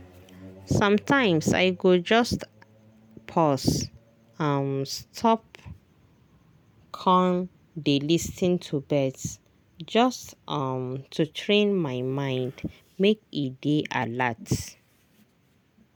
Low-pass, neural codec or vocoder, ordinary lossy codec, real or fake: none; none; none; real